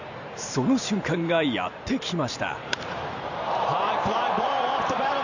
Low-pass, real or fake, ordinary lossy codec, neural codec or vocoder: 7.2 kHz; fake; none; vocoder, 44.1 kHz, 128 mel bands every 512 samples, BigVGAN v2